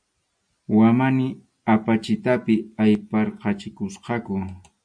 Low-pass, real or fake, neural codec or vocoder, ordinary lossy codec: 9.9 kHz; real; none; MP3, 64 kbps